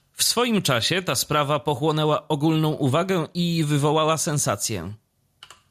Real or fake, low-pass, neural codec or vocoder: real; 14.4 kHz; none